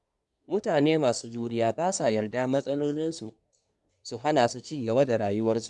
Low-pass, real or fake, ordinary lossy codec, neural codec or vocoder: 10.8 kHz; fake; none; codec, 24 kHz, 1 kbps, SNAC